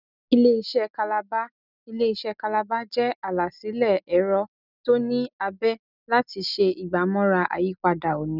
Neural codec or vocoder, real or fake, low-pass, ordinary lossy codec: none; real; 5.4 kHz; none